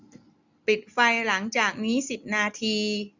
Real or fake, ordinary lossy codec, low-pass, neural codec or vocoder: real; none; 7.2 kHz; none